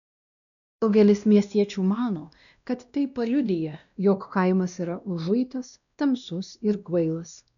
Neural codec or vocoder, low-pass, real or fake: codec, 16 kHz, 1 kbps, X-Codec, WavLM features, trained on Multilingual LibriSpeech; 7.2 kHz; fake